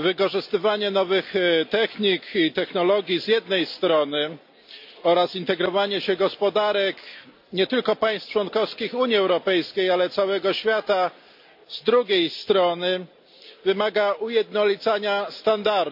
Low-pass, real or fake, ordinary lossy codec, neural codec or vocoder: 5.4 kHz; real; MP3, 48 kbps; none